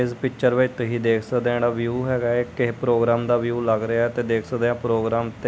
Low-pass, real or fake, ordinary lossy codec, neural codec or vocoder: none; real; none; none